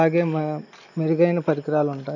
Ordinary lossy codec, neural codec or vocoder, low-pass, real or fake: none; none; 7.2 kHz; real